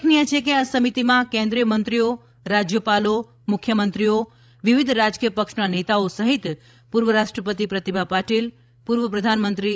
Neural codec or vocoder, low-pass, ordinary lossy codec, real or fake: codec, 16 kHz, 16 kbps, FreqCodec, larger model; none; none; fake